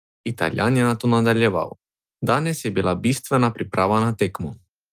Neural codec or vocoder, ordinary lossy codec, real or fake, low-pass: none; Opus, 32 kbps; real; 14.4 kHz